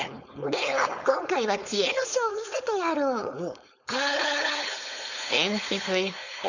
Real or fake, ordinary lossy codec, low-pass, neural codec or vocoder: fake; none; 7.2 kHz; codec, 16 kHz, 4.8 kbps, FACodec